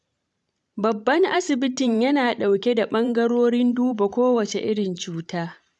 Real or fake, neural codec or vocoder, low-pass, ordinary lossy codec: fake; vocoder, 44.1 kHz, 128 mel bands every 512 samples, BigVGAN v2; 10.8 kHz; none